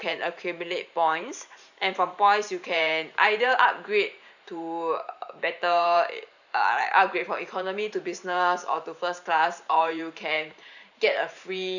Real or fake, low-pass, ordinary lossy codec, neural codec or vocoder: fake; 7.2 kHz; none; vocoder, 44.1 kHz, 128 mel bands every 512 samples, BigVGAN v2